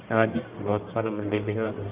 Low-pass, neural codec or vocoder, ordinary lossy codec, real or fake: 3.6 kHz; codec, 44.1 kHz, 1.7 kbps, Pupu-Codec; Opus, 24 kbps; fake